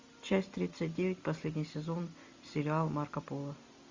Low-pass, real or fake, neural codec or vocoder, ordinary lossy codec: 7.2 kHz; real; none; MP3, 64 kbps